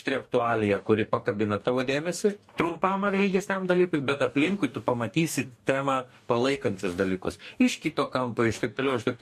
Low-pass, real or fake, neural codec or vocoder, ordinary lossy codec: 14.4 kHz; fake; codec, 44.1 kHz, 2.6 kbps, DAC; MP3, 64 kbps